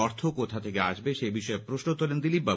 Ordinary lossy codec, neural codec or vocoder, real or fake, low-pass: none; none; real; none